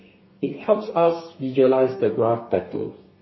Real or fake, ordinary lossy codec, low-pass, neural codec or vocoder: fake; MP3, 24 kbps; 7.2 kHz; codec, 32 kHz, 1.9 kbps, SNAC